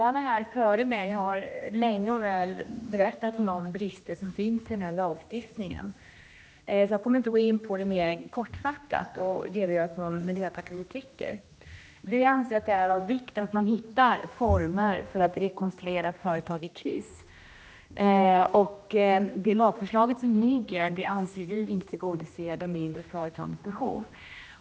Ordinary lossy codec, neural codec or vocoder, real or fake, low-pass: none; codec, 16 kHz, 1 kbps, X-Codec, HuBERT features, trained on general audio; fake; none